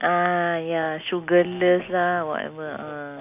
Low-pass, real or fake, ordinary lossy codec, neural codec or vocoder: 3.6 kHz; real; none; none